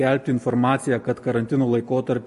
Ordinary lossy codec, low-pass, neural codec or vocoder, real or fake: MP3, 48 kbps; 14.4 kHz; none; real